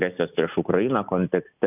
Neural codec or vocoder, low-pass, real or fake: none; 3.6 kHz; real